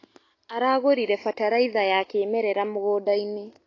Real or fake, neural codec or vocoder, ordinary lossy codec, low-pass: real; none; AAC, 32 kbps; 7.2 kHz